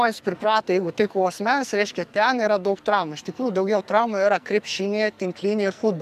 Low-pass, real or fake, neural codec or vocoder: 14.4 kHz; fake; codec, 44.1 kHz, 2.6 kbps, SNAC